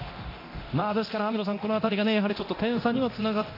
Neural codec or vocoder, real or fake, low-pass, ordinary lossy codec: codec, 24 kHz, 0.9 kbps, DualCodec; fake; 5.4 kHz; AAC, 32 kbps